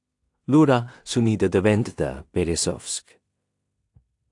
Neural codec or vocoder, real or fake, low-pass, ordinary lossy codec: codec, 16 kHz in and 24 kHz out, 0.4 kbps, LongCat-Audio-Codec, two codebook decoder; fake; 10.8 kHz; AAC, 64 kbps